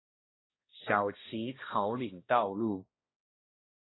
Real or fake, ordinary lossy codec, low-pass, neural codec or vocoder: fake; AAC, 16 kbps; 7.2 kHz; codec, 16 kHz, 1 kbps, X-Codec, HuBERT features, trained on balanced general audio